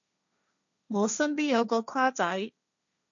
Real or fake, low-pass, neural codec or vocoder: fake; 7.2 kHz; codec, 16 kHz, 1.1 kbps, Voila-Tokenizer